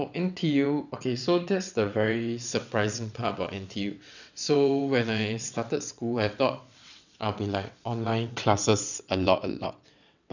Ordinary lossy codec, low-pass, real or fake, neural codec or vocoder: none; 7.2 kHz; fake; vocoder, 22.05 kHz, 80 mel bands, WaveNeXt